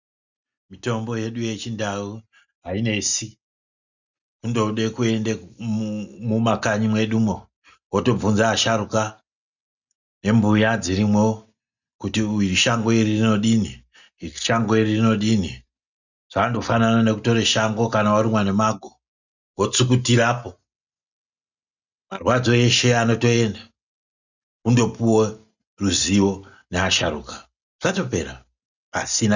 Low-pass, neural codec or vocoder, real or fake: 7.2 kHz; none; real